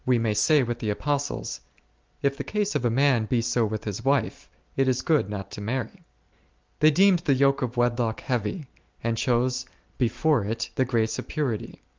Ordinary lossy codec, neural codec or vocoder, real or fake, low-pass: Opus, 24 kbps; none; real; 7.2 kHz